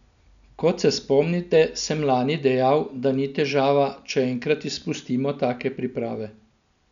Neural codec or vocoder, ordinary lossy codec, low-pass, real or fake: none; none; 7.2 kHz; real